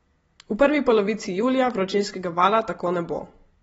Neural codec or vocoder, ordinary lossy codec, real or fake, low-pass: none; AAC, 24 kbps; real; 19.8 kHz